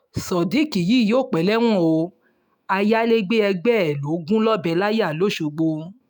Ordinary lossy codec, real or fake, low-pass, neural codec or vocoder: none; fake; none; autoencoder, 48 kHz, 128 numbers a frame, DAC-VAE, trained on Japanese speech